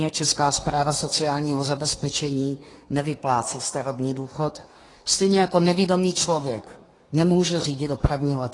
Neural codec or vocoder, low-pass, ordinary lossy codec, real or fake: codec, 24 kHz, 1 kbps, SNAC; 10.8 kHz; AAC, 32 kbps; fake